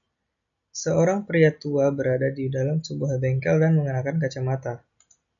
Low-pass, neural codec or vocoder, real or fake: 7.2 kHz; none; real